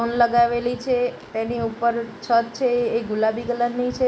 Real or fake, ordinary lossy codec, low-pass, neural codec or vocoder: real; none; none; none